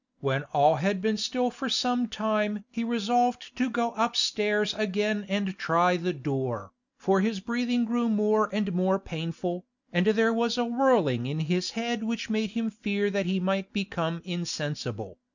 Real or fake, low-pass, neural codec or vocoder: real; 7.2 kHz; none